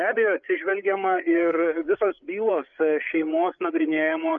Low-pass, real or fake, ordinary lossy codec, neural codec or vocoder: 7.2 kHz; fake; MP3, 96 kbps; codec, 16 kHz, 8 kbps, FreqCodec, larger model